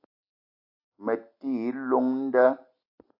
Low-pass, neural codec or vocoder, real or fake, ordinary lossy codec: 5.4 kHz; none; real; AAC, 32 kbps